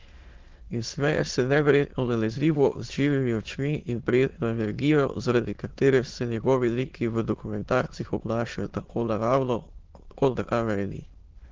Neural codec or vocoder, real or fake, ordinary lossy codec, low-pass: autoencoder, 22.05 kHz, a latent of 192 numbers a frame, VITS, trained on many speakers; fake; Opus, 16 kbps; 7.2 kHz